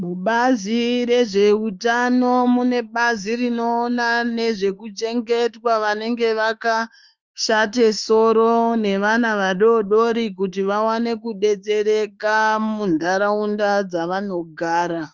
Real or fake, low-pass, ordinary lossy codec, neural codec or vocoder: fake; 7.2 kHz; Opus, 32 kbps; autoencoder, 48 kHz, 32 numbers a frame, DAC-VAE, trained on Japanese speech